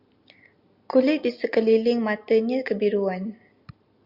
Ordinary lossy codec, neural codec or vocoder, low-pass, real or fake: Opus, 64 kbps; none; 5.4 kHz; real